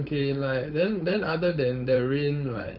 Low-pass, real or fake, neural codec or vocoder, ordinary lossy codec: 5.4 kHz; fake; codec, 16 kHz, 4.8 kbps, FACodec; none